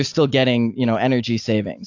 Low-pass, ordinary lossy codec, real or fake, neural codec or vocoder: 7.2 kHz; AAC, 48 kbps; real; none